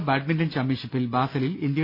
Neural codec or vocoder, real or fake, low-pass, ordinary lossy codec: none; real; 5.4 kHz; none